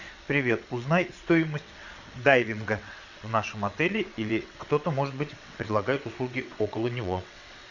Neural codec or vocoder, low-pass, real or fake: vocoder, 22.05 kHz, 80 mel bands, WaveNeXt; 7.2 kHz; fake